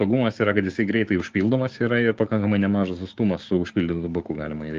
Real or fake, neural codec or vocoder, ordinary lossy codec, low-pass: fake; codec, 16 kHz, 6 kbps, DAC; Opus, 32 kbps; 7.2 kHz